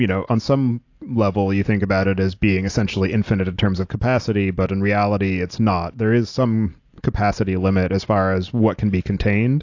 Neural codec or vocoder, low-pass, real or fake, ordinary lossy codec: none; 7.2 kHz; real; AAC, 48 kbps